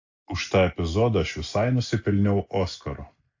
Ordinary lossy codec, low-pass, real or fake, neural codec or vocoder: AAC, 48 kbps; 7.2 kHz; real; none